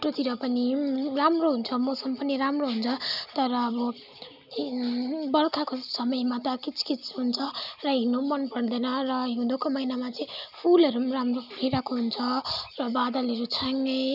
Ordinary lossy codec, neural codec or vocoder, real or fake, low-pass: none; none; real; 5.4 kHz